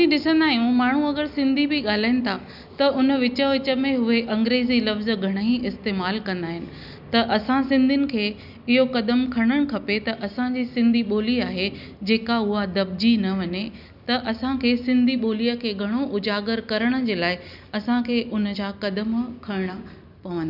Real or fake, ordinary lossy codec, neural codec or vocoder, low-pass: real; none; none; 5.4 kHz